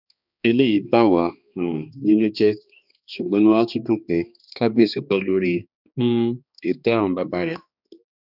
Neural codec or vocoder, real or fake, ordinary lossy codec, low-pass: codec, 16 kHz, 2 kbps, X-Codec, HuBERT features, trained on balanced general audio; fake; none; 5.4 kHz